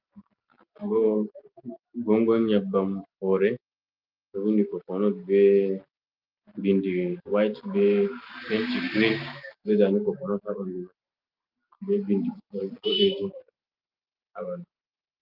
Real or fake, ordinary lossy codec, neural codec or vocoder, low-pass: real; Opus, 32 kbps; none; 5.4 kHz